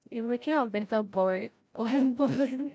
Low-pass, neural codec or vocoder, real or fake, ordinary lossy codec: none; codec, 16 kHz, 0.5 kbps, FreqCodec, larger model; fake; none